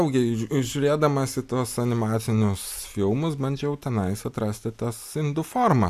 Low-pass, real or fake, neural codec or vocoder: 14.4 kHz; real; none